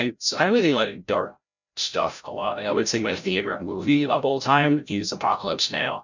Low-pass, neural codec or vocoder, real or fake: 7.2 kHz; codec, 16 kHz, 0.5 kbps, FreqCodec, larger model; fake